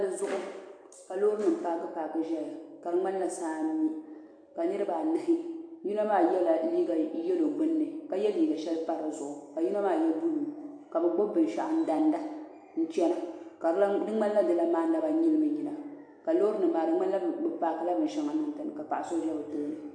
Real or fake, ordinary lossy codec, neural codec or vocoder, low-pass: real; AAC, 48 kbps; none; 9.9 kHz